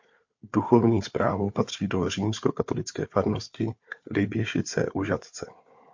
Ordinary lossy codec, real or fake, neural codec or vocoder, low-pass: MP3, 48 kbps; fake; codec, 16 kHz, 4 kbps, FunCodec, trained on Chinese and English, 50 frames a second; 7.2 kHz